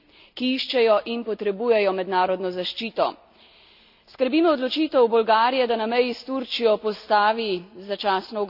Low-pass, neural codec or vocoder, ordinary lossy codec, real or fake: 5.4 kHz; none; none; real